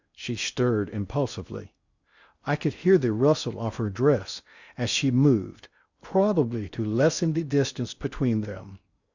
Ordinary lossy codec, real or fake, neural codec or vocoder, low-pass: Opus, 64 kbps; fake; codec, 16 kHz in and 24 kHz out, 0.6 kbps, FocalCodec, streaming, 2048 codes; 7.2 kHz